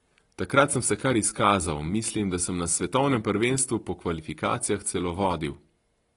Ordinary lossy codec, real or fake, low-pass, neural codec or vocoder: AAC, 32 kbps; real; 10.8 kHz; none